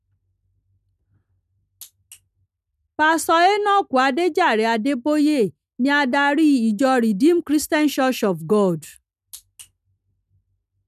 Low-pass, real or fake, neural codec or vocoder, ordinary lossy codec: 14.4 kHz; real; none; none